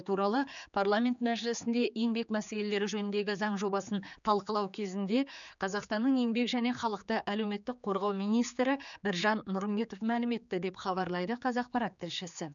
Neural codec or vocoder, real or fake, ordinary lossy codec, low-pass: codec, 16 kHz, 4 kbps, X-Codec, HuBERT features, trained on general audio; fake; none; 7.2 kHz